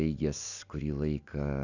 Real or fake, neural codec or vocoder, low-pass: real; none; 7.2 kHz